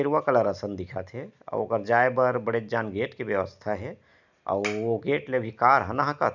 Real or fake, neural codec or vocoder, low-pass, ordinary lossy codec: real; none; 7.2 kHz; none